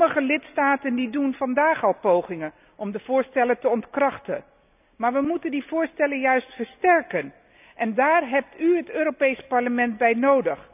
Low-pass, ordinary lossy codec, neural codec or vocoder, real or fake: 3.6 kHz; none; none; real